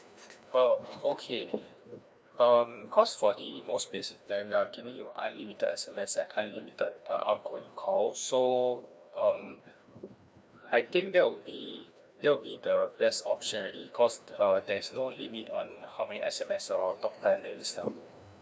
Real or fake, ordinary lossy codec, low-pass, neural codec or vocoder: fake; none; none; codec, 16 kHz, 1 kbps, FreqCodec, larger model